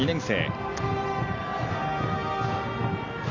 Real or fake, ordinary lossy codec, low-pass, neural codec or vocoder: real; AAC, 48 kbps; 7.2 kHz; none